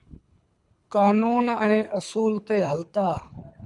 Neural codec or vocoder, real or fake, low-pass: codec, 24 kHz, 3 kbps, HILCodec; fake; 10.8 kHz